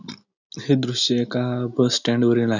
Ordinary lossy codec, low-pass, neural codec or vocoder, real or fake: none; 7.2 kHz; none; real